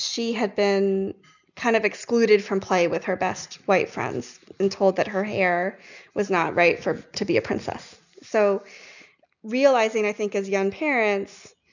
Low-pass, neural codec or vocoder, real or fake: 7.2 kHz; none; real